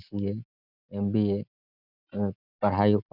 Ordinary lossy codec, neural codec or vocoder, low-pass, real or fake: none; none; 5.4 kHz; real